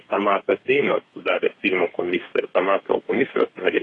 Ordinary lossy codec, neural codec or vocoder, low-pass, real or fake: AAC, 32 kbps; codec, 32 kHz, 1.9 kbps, SNAC; 10.8 kHz; fake